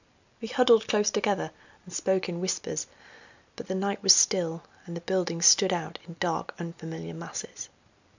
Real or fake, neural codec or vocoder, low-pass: real; none; 7.2 kHz